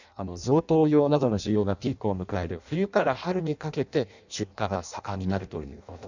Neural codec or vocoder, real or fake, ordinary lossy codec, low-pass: codec, 16 kHz in and 24 kHz out, 0.6 kbps, FireRedTTS-2 codec; fake; none; 7.2 kHz